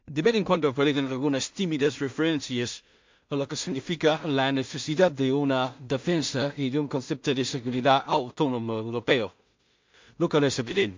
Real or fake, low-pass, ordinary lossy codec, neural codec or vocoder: fake; 7.2 kHz; MP3, 48 kbps; codec, 16 kHz in and 24 kHz out, 0.4 kbps, LongCat-Audio-Codec, two codebook decoder